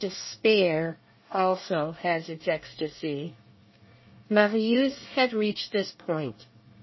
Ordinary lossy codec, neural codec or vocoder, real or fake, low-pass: MP3, 24 kbps; codec, 24 kHz, 1 kbps, SNAC; fake; 7.2 kHz